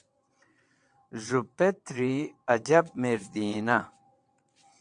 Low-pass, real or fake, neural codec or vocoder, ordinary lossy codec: 9.9 kHz; fake; vocoder, 22.05 kHz, 80 mel bands, WaveNeXt; AAC, 64 kbps